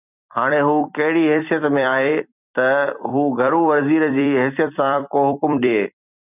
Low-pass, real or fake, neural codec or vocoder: 3.6 kHz; fake; vocoder, 44.1 kHz, 128 mel bands every 256 samples, BigVGAN v2